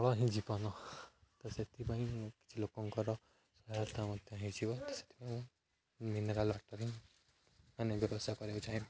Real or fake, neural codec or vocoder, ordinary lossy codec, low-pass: real; none; none; none